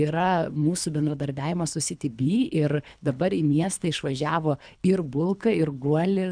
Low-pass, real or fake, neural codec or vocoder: 9.9 kHz; fake; codec, 24 kHz, 3 kbps, HILCodec